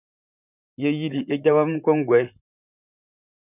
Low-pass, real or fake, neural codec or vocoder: 3.6 kHz; fake; vocoder, 44.1 kHz, 128 mel bands, Pupu-Vocoder